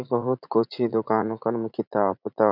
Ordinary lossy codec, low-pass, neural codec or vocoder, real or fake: none; 5.4 kHz; vocoder, 44.1 kHz, 80 mel bands, Vocos; fake